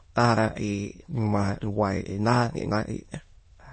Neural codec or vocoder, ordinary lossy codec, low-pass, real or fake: autoencoder, 22.05 kHz, a latent of 192 numbers a frame, VITS, trained on many speakers; MP3, 32 kbps; 9.9 kHz; fake